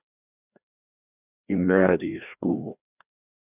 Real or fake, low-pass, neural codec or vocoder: fake; 3.6 kHz; codec, 16 kHz, 1 kbps, FreqCodec, larger model